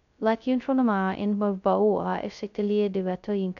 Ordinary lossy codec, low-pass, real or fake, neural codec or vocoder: none; 7.2 kHz; fake; codec, 16 kHz, 0.2 kbps, FocalCodec